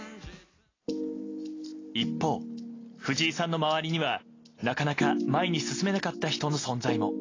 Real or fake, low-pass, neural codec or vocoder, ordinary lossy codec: real; 7.2 kHz; none; AAC, 32 kbps